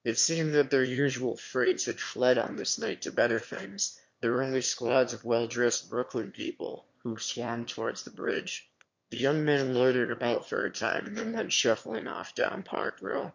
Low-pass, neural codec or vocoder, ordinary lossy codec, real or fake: 7.2 kHz; autoencoder, 22.05 kHz, a latent of 192 numbers a frame, VITS, trained on one speaker; MP3, 48 kbps; fake